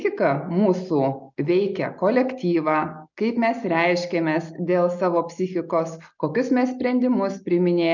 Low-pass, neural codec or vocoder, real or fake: 7.2 kHz; none; real